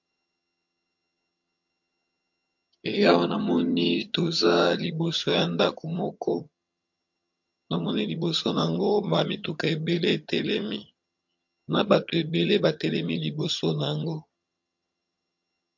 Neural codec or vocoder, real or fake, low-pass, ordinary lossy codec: vocoder, 22.05 kHz, 80 mel bands, HiFi-GAN; fake; 7.2 kHz; MP3, 48 kbps